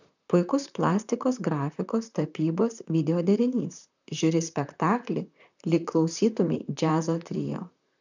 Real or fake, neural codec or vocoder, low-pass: fake; vocoder, 44.1 kHz, 128 mel bands, Pupu-Vocoder; 7.2 kHz